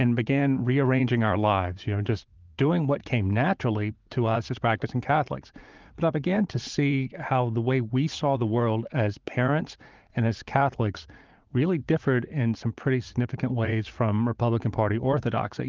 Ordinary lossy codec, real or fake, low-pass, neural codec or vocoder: Opus, 24 kbps; fake; 7.2 kHz; vocoder, 44.1 kHz, 80 mel bands, Vocos